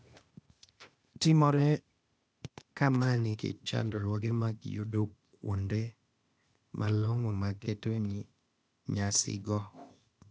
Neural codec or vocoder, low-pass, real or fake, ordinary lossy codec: codec, 16 kHz, 0.8 kbps, ZipCodec; none; fake; none